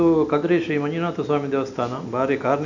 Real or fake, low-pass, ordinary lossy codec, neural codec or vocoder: real; 7.2 kHz; none; none